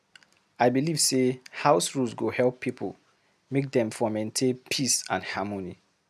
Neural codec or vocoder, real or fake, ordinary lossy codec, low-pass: none; real; none; 14.4 kHz